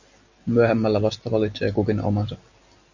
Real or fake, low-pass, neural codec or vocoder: real; 7.2 kHz; none